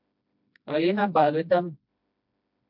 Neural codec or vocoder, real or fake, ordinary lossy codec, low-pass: codec, 16 kHz, 1 kbps, FreqCodec, smaller model; fake; MP3, 48 kbps; 5.4 kHz